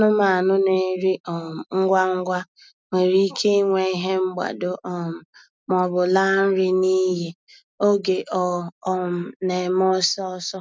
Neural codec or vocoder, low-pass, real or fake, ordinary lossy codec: none; none; real; none